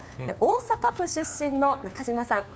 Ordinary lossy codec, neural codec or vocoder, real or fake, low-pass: none; codec, 16 kHz, 2 kbps, FunCodec, trained on LibriTTS, 25 frames a second; fake; none